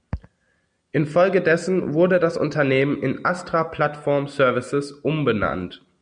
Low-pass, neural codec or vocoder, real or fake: 9.9 kHz; none; real